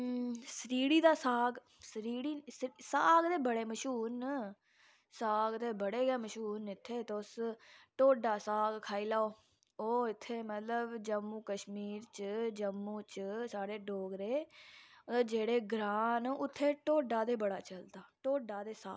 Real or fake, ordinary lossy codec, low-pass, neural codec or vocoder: real; none; none; none